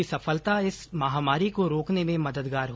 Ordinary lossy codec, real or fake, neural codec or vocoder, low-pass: none; real; none; none